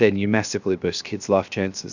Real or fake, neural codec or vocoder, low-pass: fake; codec, 16 kHz, 0.7 kbps, FocalCodec; 7.2 kHz